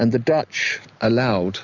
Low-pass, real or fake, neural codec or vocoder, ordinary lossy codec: 7.2 kHz; real; none; Opus, 64 kbps